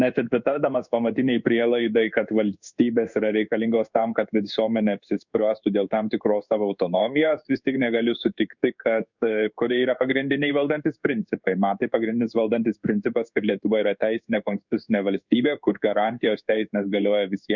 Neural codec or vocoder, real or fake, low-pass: codec, 16 kHz in and 24 kHz out, 1 kbps, XY-Tokenizer; fake; 7.2 kHz